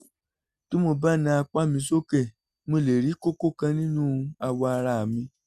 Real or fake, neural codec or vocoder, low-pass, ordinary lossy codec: real; none; 14.4 kHz; Opus, 64 kbps